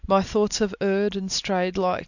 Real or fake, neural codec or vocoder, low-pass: real; none; 7.2 kHz